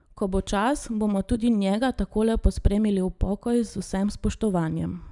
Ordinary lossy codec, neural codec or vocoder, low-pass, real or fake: none; none; 10.8 kHz; real